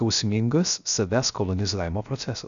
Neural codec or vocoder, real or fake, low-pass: codec, 16 kHz, 0.3 kbps, FocalCodec; fake; 7.2 kHz